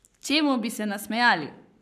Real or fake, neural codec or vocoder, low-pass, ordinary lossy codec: fake; codec, 44.1 kHz, 7.8 kbps, Pupu-Codec; 14.4 kHz; none